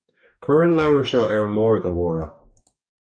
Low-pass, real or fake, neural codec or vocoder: 9.9 kHz; fake; codec, 44.1 kHz, 2.6 kbps, DAC